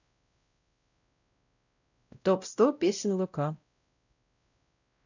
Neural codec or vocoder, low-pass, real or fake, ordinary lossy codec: codec, 16 kHz, 0.5 kbps, X-Codec, WavLM features, trained on Multilingual LibriSpeech; 7.2 kHz; fake; none